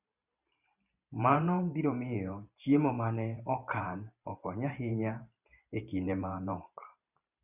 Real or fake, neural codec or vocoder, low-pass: fake; vocoder, 44.1 kHz, 128 mel bands every 512 samples, BigVGAN v2; 3.6 kHz